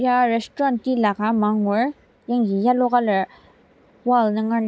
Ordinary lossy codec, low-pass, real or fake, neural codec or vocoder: none; none; real; none